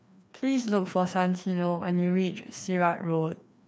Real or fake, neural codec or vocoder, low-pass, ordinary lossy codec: fake; codec, 16 kHz, 2 kbps, FreqCodec, larger model; none; none